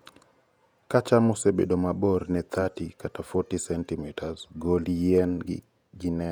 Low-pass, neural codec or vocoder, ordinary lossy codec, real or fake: 19.8 kHz; vocoder, 48 kHz, 128 mel bands, Vocos; none; fake